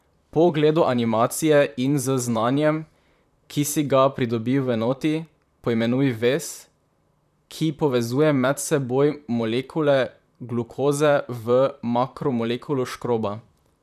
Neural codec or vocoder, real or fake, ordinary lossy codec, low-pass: vocoder, 44.1 kHz, 128 mel bands, Pupu-Vocoder; fake; none; 14.4 kHz